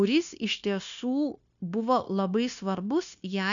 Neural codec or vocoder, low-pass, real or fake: codec, 16 kHz, 0.9 kbps, LongCat-Audio-Codec; 7.2 kHz; fake